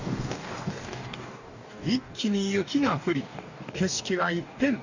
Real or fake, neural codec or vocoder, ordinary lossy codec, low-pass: fake; codec, 44.1 kHz, 2.6 kbps, DAC; none; 7.2 kHz